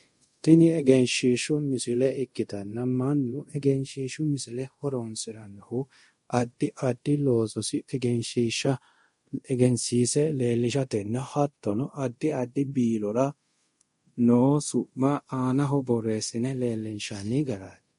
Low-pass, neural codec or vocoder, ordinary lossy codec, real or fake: 10.8 kHz; codec, 24 kHz, 0.5 kbps, DualCodec; MP3, 48 kbps; fake